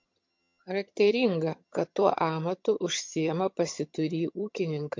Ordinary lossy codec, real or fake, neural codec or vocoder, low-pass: MP3, 48 kbps; fake; vocoder, 22.05 kHz, 80 mel bands, HiFi-GAN; 7.2 kHz